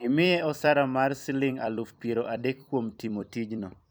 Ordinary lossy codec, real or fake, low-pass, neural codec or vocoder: none; fake; none; vocoder, 44.1 kHz, 128 mel bands every 256 samples, BigVGAN v2